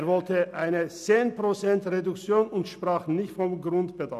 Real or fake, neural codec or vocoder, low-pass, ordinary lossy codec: real; none; 14.4 kHz; none